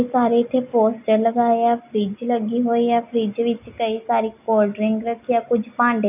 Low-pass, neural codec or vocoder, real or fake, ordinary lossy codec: 3.6 kHz; none; real; none